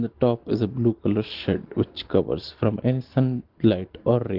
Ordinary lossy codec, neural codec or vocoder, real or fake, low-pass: Opus, 16 kbps; none; real; 5.4 kHz